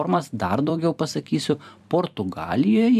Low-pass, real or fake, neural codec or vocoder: 14.4 kHz; fake; vocoder, 44.1 kHz, 128 mel bands every 256 samples, BigVGAN v2